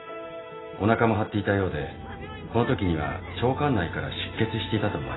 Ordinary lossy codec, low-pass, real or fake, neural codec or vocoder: AAC, 16 kbps; 7.2 kHz; real; none